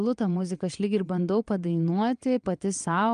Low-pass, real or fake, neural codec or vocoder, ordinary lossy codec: 9.9 kHz; fake; vocoder, 22.05 kHz, 80 mel bands, WaveNeXt; Opus, 32 kbps